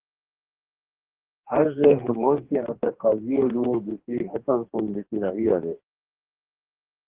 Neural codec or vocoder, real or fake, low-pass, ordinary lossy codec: codec, 44.1 kHz, 2.6 kbps, DAC; fake; 3.6 kHz; Opus, 16 kbps